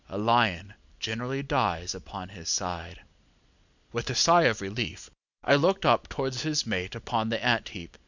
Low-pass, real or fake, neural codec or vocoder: 7.2 kHz; real; none